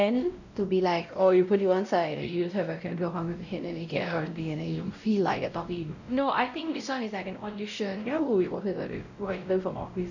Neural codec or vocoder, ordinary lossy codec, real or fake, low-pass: codec, 16 kHz, 0.5 kbps, X-Codec, WavLM features, trained on Multilingual LibriSpeech; none; fake; 7.2 kHz